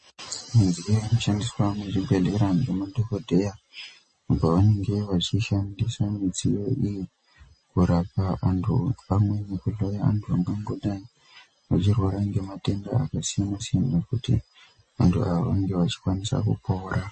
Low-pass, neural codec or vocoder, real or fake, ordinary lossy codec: 10.8 kHz; none; real; MP3, 32 kbps